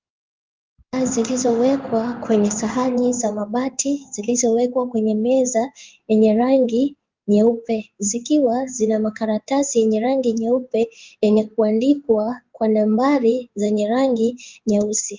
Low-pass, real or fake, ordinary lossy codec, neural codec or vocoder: 7.2 kHz; fake; Opus, 24 kbps; codec, 16 kHz in and 24 kHz out, 1 kbps, XY-Tokenizer